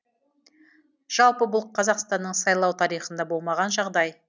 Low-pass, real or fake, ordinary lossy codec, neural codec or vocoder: none; real; none; none